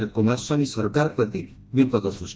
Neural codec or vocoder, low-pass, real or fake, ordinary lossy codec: codec, 16 kHz, 1 kbps, FreqCodec, smaller model; none; fake; none